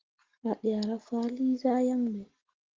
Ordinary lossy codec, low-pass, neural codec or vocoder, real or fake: Opus, 16 kbps; 7.2 kHz; none; real